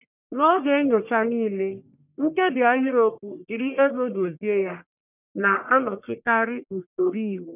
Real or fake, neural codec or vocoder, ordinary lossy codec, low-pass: fake; codec, 44.1 kHz, 1.7 kbps, Pupu-Codec; none; 3.6 kHz